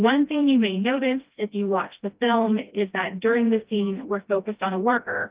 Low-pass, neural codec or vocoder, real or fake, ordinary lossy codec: 3.6 kHz; codec, 16 kHz, 1 kbps, FreqCodec, smaller model; fake; Opus, 32 kbps